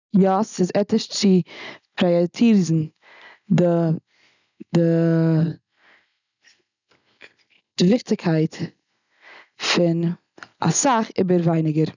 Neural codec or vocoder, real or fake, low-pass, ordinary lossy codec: none; real; 7.2 kHz; none